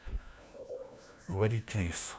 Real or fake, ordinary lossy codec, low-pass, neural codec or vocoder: fake; none; none; codec, 16 kHz, 1 kbps, FunCodec, trained on LibriTTS, 50 frames a second